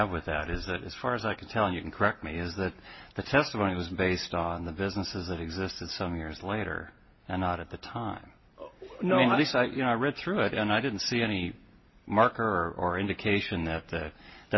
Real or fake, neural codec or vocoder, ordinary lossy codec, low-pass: real; none; MP3, 24 kbps; 7.2 kHz